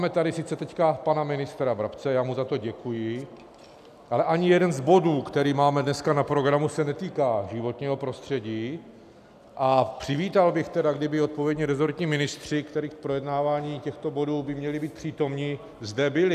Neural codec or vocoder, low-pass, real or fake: none; 14.4 kHz; real